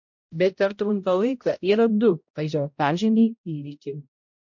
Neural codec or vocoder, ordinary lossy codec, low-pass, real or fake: codec, 16 kHz, 0.5 kbps, X-Codec, HuBERT features, trained on balanced general audio; MP3, 48 kbps; 7.2 kHz; fake